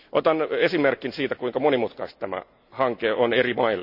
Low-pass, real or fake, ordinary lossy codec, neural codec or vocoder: 5.4 kHz; real; none; none